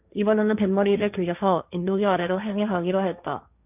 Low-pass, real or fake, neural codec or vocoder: 3.6 kHz; fake; codec, 16 kHz, 1.1 kbps, Voila-Tokenizer